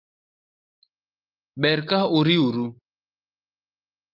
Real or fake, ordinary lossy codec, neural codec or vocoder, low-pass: real; Opus, 32 kbps; none; 5.4 kHz